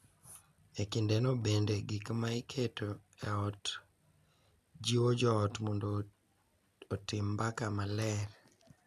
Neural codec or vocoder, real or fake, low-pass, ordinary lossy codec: none; real; 14.4 kHz; none